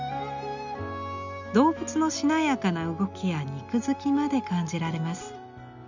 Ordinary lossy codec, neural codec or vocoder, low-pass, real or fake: none; none; 7.2 kHz; real